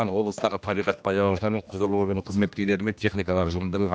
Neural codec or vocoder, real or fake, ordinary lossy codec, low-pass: codec, 16 kHz, 1 kbps, X-Codec, HuBERT features, trained on general audio; fake; none; none